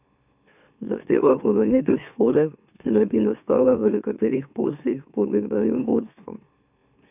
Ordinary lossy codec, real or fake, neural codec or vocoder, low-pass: none; fake; autoencoder, 44.1 kHz, a latent of 192 numbers a frame, MeloTTS; 3.6 kHz